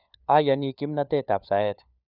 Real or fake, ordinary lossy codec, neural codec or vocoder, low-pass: fake; none; codec, 16 kHz, 4 kbps, FunCodec, trained on LibriTTS, 50 frames a second; 5.4 kHz